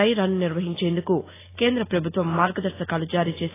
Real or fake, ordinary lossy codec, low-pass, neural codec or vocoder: real; AAC, 16 kbps; 3.6 kHz; none